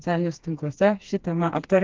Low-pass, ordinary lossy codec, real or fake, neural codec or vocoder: 7.2 kHz; Opus, 32 kbps; fake; codec, 16 kHz, 2 kbps, FreqCodec, smaller model